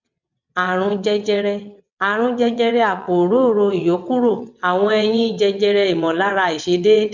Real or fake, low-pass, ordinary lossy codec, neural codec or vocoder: fake; 7.2 kHz; none; vocoder, 22.05 kHz, 80 mel bands, WaveNeXt